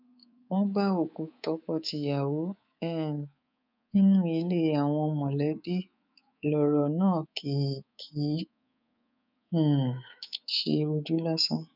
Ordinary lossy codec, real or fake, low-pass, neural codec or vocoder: none; fake; 5.4 kHz; codec, 24 kHz, 3.1 kbps, DualCodec